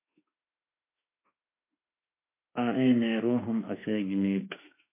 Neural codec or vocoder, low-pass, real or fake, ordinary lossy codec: autoencoder, 48 kHz, 32 numbers a frame, DAC-VAE, trained on Japanese speech; 3.6 kHz; fake; MP3, 24 kbps